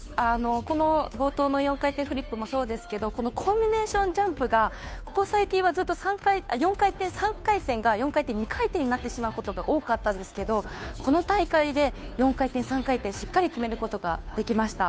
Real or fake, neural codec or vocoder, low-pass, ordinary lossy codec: fake; codec, 16 kHz, 2 kbps, FunCodec, trained on Chinese and English, 25 frames a second; none; none